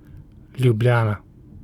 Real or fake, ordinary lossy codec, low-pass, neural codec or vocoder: real; none; 19.8 kHz; none